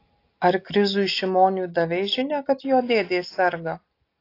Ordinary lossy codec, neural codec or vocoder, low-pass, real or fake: AAC, 32 kbps; none; 5.4 kHz; real